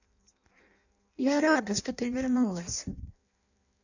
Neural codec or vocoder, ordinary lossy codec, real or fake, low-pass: codec, 16 kHz in and 24 kHz out, 0.6 kbps, FireRedTTS-2 codec; none; fake; 7.2 kHz